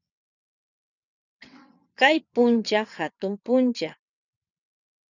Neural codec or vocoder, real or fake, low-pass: vocoder, 22.05 kHz, 80 mel bands, WaveNeXt; fake; 7.2 kHz